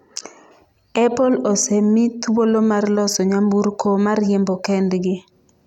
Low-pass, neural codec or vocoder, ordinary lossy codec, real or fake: 19.8 kHz; none; none; real